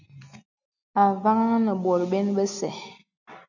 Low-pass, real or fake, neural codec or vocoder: 7.2 kHz; real; none